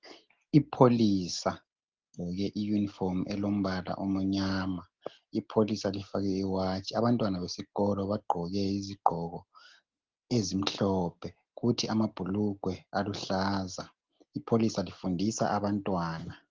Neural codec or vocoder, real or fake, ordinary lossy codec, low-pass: none; real; Opus, 16 kbps; 7.2 kHz